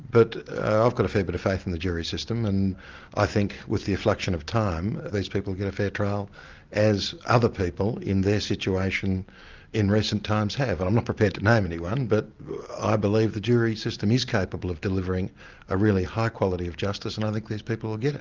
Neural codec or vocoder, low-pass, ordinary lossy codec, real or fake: none; 7.2 kHz; Opus, 24 kbps; real